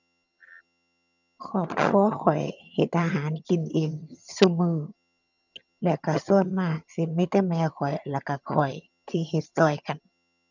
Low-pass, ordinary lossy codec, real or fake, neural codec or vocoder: 7.2 kHz; none; fake; vocoder, 22.05 kHz, 80 mel bands, HiFi-GAN